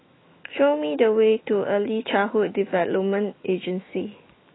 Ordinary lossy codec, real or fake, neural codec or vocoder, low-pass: AAC, 16 kbps; fake; autoencoder, 48 kHz, 128 numbers a frame, DAC-VAE, trained on Japanese speech; 7.2 kHz